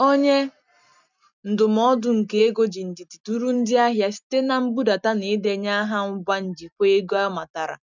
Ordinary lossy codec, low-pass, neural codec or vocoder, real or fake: none; 7.2 kHz; none; real